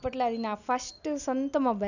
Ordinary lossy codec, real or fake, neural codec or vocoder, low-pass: none; real; none; 7.2 kHz